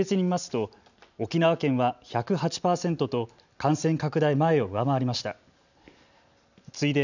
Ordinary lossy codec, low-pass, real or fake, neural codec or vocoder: none; 7.2 kHz; real; none